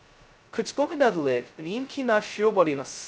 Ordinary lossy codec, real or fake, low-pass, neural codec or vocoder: none; fake; none; codec, 16 kHz, 0.2 kbps, FocalCodec